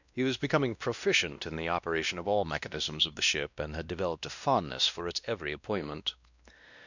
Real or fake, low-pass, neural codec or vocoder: fake; 7.2 kHz; codec, 16 kHz, 1 kbps, X-Codec, WavLM features, trained on Multilingual LibriSpeech